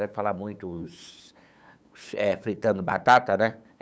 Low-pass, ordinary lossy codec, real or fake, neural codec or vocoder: none; none; fake; codec, 16 kHz, 8 kbps, FunCodec, trained on LibriTTS, 25 frames a second